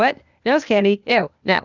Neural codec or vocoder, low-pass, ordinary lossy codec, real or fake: codec, 16 kHz, 0.8 kbps, ZipCodec; 7.2 kHz; Opus, 64 kbps; fake